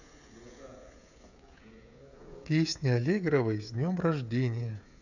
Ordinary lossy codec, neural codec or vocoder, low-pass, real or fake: none; codec, 16 kHz, 16 kbps, FreqCodec, smaller model; 7.2 kHz; fake